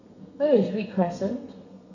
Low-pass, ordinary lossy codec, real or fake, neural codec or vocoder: 7.2 kHz; none; fake; codec, 32 kHz, 1.9 kbps, SNAC